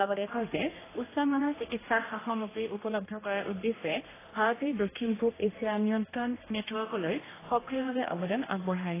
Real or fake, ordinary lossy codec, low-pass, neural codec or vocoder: fake; AAC, 16 kbps; 3.6 kHz; codec, 16 kHz, 1 kbps, X-Codec, HuBERT features, trained on general audio